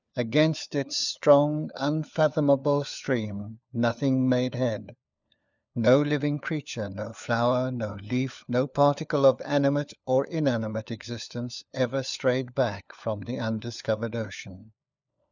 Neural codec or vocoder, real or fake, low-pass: codec, 16 kHz, 4 kbps, FunCodec, trained on LibriTTS, 50 frames a second; fake; 7.2 kHz